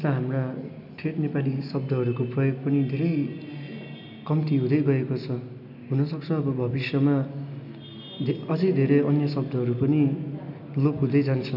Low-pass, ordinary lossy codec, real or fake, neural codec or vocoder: 5.4 kHz; none; real; none